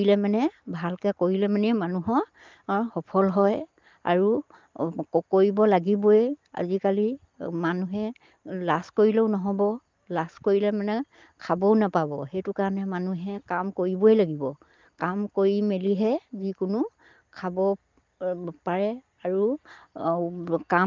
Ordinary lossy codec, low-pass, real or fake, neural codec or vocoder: Opus, 32 kbps; 7.2 kHz; real; none